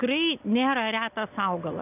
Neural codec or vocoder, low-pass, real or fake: none; 3.6 kHz; real